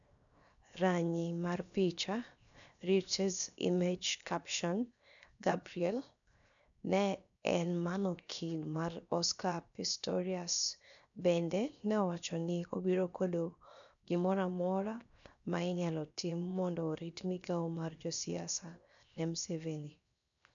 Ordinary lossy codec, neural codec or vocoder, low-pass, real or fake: none; codec, 16 kHz, 0.7 kbps, FocalCodec; 7.2 kHz; fake